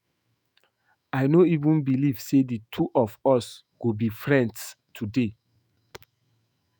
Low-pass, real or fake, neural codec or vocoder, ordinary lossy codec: none; fake; autoencoder, 48 kHz, 128 numbers a frame, DAC-VAE, trained on Japanese speech; none